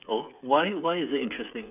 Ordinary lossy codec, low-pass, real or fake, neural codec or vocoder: none; 3.6 kHz; fake; codec, 16 kHz, 8 kbps, FreqCodec, smaller model